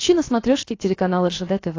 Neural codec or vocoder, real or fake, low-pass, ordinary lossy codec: codec, 16 kHz, about 1 kbps, DyCAST, with the encoder's durations; fake; 7.2 kHz; AAC, 32 kbps